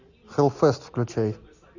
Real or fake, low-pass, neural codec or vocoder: real; 7.2 kHz; none